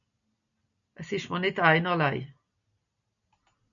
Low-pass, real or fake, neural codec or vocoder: 7.2 kHz; real; none